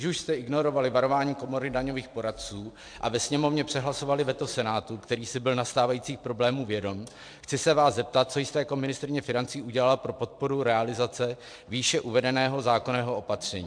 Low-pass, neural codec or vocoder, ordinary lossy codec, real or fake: 9.9 kHz; vocoder, 44.1 kHz, 128 mel bands every 256 samples, BigVGAN v2; MP3, 64 kbps; fake